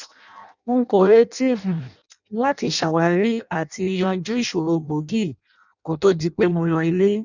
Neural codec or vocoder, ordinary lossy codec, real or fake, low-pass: codec, 16 kHz in and 24 kHz out, 0.6 kbps, FireRedTTS-2 codec; none; fake; 7.2 kHz